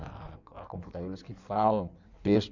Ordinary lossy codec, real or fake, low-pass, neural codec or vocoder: none; fake; 7.2 kHz; codec, 16 kHz in and 24 kHz out, 1.1 kbps, FireRedTTS-2 codec